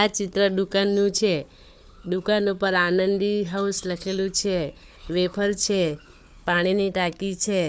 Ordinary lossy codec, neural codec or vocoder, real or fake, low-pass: none; codec, 16 kHz, 4 kbps, FunCodec, trained on Chinese and English, 50 frames a second; fake; none